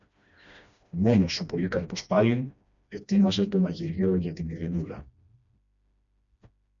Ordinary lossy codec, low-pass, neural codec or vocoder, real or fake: MP3, 96 kbps; 7.2 kHz; codec, 16 kHz, 1 kbps, FreqCodec, smaller model; fake